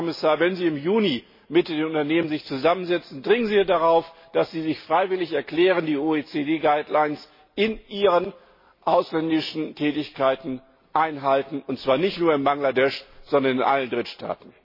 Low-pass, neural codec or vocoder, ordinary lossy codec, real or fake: 5.4 kHz; none; MP3, 24 kbps; real